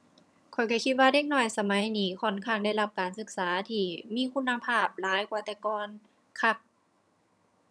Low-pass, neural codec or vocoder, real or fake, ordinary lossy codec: none; vocoder, 22.05 kHz, 80 mel bands, HiFi-GAN; fake; none